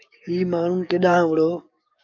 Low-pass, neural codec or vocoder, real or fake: 7.2 kHz; codec, 44.1 kHz, 7.8 kbps, DAC; fake